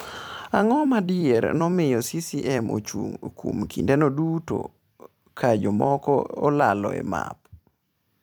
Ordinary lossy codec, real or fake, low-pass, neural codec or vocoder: none; fake; none; vocoder, 44.1 kHz, 128 mel bands every 256 samples, BigVGAN v2